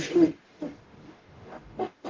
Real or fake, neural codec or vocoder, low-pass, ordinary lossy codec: fake; codec, 44.1 kHz, 0.9 kbps, DAC; 7.2 kHz; Opus, 16 kbps